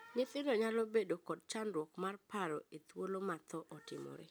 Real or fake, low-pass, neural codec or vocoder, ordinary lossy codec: real; none; none; none